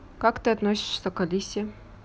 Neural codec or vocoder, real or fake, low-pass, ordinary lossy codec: none; real; none; none